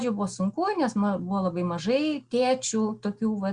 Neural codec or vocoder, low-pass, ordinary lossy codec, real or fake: none; 9.9 kHz; Opus, 64 kbps; real